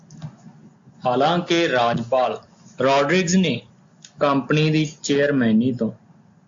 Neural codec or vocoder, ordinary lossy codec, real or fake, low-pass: none; AAC, 64 kbps; real; 7.2 kHz